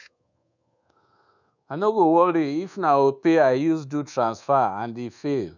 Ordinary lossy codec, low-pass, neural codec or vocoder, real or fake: none; 7.2 kHz; codec, 24 kHz, 1.2 kbps, DualCodec; fake